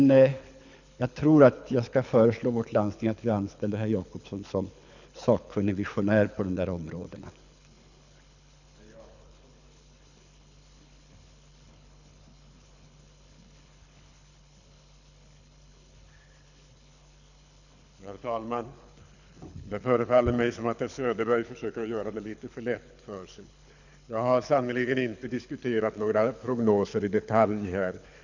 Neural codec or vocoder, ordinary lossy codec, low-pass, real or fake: codec, 24 kHz, 6 kbps, HILCodec; none; 7.2 kHz; fake